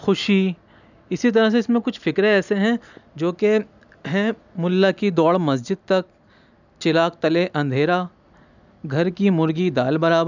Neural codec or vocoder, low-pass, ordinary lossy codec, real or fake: none; 7.2 kHz; none; real